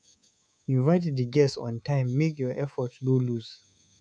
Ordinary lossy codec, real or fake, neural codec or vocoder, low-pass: none; fake; codec, 24 kHz, 3.1 kbps, DualCodec; 9.9 kHz